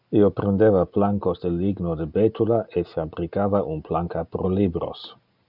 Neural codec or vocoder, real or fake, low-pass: none; real; 5.4 kHz